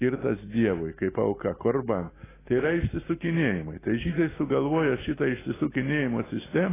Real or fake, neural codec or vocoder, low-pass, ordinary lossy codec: real; none; 3.6 kHz; AAC, 16 kbps